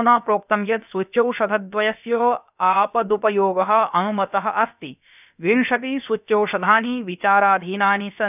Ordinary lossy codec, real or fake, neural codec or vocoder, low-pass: none; fake; codec, 16 kHz, about 1 kbps, DyCAST, with the encoder's durations; 3.6 kHz